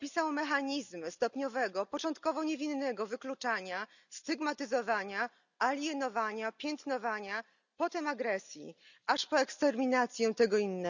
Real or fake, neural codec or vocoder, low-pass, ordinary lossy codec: real; none; 7.2 kHz; none